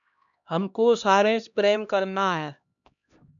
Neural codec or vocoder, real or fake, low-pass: codec, 16 kHz, 1 kbps, X-Codec, HuBERT features, trained on LibriSpeech; fake; 7.2 kHz